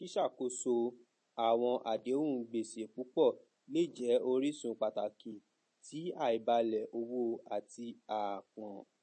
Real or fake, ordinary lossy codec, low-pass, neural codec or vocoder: real; MP3, 32 kbps; 10.8 kHz; none